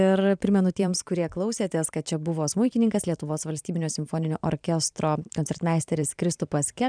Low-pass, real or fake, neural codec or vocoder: 9.9 kHz; fake; vocoder, 44.1 kHz, 128 mel bands every 512 samples, BigVGAN v2